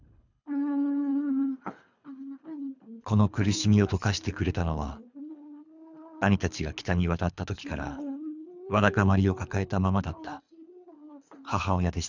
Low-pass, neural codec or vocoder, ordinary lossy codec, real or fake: 7.2 kHz; codec, 24 kHz, 3 kbps, HILCodec; none; fake